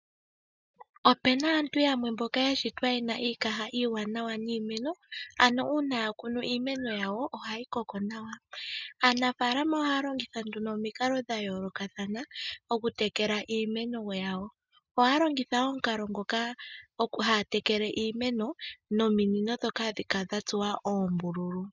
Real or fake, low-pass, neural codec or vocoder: real; 7.2 kHz; none